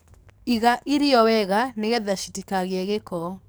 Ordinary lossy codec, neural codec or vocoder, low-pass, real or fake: none; codec, 44.1 kHz, 7.8 kbps, DAC; none; fake